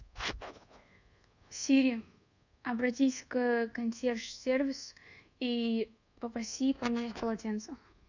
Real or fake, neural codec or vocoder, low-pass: fake; codec, 24 kHz, 1.2 kbps, DualCodec; 7.2 kHz